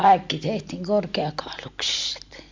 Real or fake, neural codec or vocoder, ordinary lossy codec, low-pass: real; none; MP3, 64 kbps; 7.2 kHz